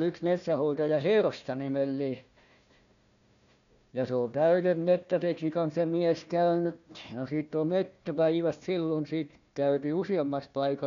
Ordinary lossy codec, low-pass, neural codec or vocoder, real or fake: none; 7.2 kHz; codec, 16 kHz, 1 kbps, FunCodec, trained on Chinese and English, 50 frames a second; fake